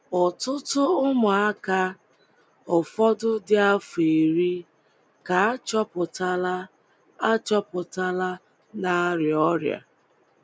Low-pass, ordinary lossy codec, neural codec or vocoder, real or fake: none; none; none; real